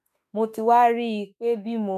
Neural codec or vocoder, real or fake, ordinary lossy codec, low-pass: autoencoder, 48 kHz, 32 numbers a frame, DAC-VAE, trained on Japanese speech; fake; none; 14.4 kHz